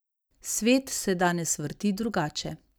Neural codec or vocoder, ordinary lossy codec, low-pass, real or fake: none; none; none; real